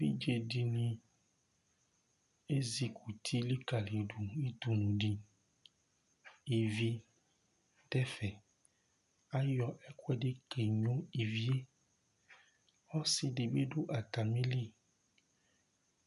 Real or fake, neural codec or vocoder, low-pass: real; none; 10.8 kHz